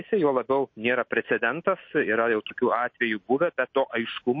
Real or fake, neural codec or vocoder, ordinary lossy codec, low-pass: real; none; MP3, 32 kbps; 7.2 kHz